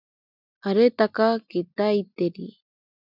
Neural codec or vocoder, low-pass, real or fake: none; 5.4 kHz; real